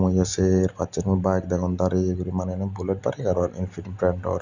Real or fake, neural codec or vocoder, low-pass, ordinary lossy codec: real; none; 7.2 kHz; Opus, 64 kbps